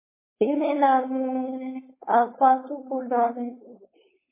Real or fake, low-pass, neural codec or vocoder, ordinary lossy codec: fake; 3.6 kHz; codec, 16 kHz, 4.8 kbps, FACodec; MP3, 16 kbps